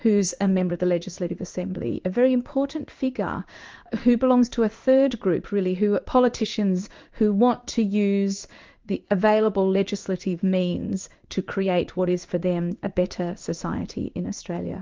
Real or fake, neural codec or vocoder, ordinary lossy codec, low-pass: fake; codec, 16 kHz in and 24 kHz out, 1 kbps, XY-Tokenizer; Opus, 24 kbps; 7.2 kHz